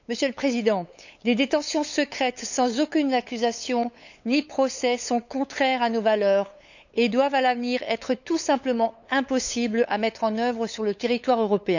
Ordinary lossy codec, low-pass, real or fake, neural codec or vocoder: none; 7.2 kHz; fake; codec, 16 kHz, 8 kbps, FunCodec, trained on LibriTTS, 25 frames a second